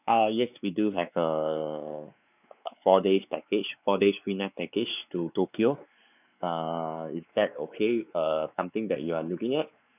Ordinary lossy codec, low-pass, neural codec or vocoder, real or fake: none; 3.6 kHz; codec, 16 kHz, 4 kbps, X-Codec, WavLM features, trained on Multilingual LibriSpeech; fake